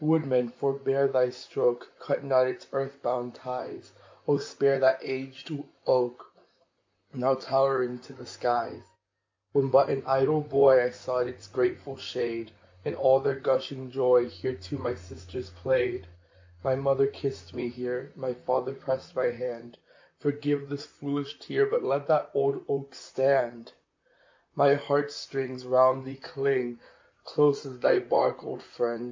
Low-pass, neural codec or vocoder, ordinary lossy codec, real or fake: 7.2 kHz; vocoder, 44.1 kHz, 128 mel bands, Pupu-Vocoder; MP3, 48 kbps; fake